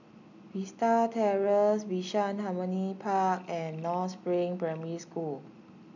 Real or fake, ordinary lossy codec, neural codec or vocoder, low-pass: real; none; none; 7.2 kHz